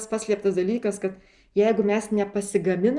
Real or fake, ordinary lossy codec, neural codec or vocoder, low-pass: real; Opus, 64 kbps; none; 10.8 kHz